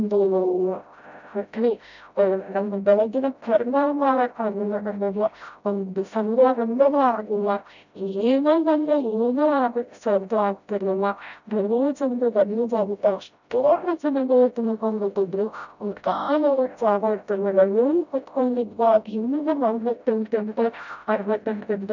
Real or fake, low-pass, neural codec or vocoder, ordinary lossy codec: fake; 7.2 kHz; codec, 16 kHz, 0.5 kbps, FreqCodec, smaller model; none